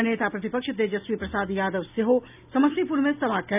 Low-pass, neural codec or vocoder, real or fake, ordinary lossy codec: 3.6 kHz; none; real; none